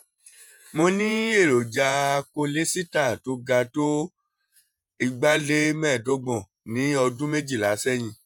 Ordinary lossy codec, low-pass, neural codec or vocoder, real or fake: none; none; vocoder, 48 kHz, 128 mel bands, Vocos; fake